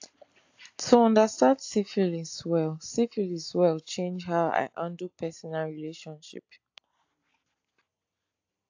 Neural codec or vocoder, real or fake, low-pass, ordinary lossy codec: none; real; 7.2 kHz; AAC, 48 kbps